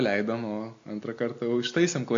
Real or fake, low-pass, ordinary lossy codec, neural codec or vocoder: real; 7.2 kHz; AAC, 48 kbps; none